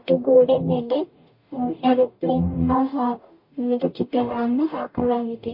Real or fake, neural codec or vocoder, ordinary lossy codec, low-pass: fake; codec, 44.1 kHz, 0.9 kbps, DAC; MP3, 32 kbps; 5.4 kHz